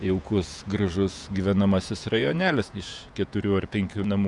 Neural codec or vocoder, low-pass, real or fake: vocoder, 48 kHz, 128 mel bands, Vocos; 10.8 kHz; fake